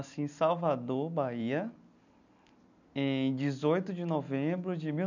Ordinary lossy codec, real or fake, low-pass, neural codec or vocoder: none; real; 7.2 kHz; none